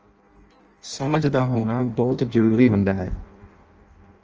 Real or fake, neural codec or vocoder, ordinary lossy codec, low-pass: fake; codec, 16 kHz in and 24 kHz out, 0.6 kbps, FireRedTTS-2 codec; Opus, 24 kbps; 7.2 kHz